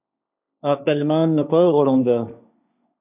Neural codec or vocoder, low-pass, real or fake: codec, 16 kHz, 1.1 kbps, Voila-Tokenizer; 3.6 kHz; fake